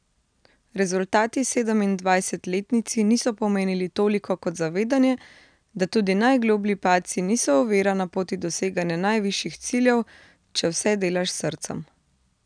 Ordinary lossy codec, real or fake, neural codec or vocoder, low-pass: none; real; none; 9.9 kHz